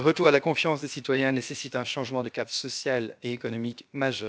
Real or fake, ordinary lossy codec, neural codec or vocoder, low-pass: fake; none; codec, 16 kHz, about 1 kbps, DyCAST, with the encoder's durations; none